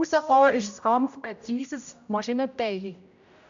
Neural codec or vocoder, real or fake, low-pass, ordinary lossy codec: codec, 16 kHz, 0.5 kbps, X-Codec, HuBERT features, trained on general audio; fake; 7.2 kHz; AAC, 64 kbps